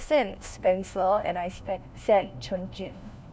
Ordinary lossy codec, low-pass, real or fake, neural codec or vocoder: none; none; fake; codec, 16 kHz, 1 kbps, FunCodec, trained on LibriTTS, 50 frames a second